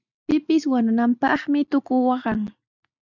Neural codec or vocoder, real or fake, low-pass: none; real; 7.2 kHz